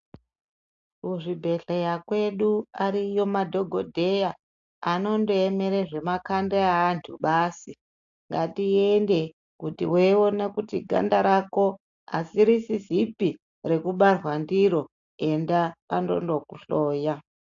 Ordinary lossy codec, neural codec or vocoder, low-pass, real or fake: AAC, 64 kbps; none; 7.2 kHz; real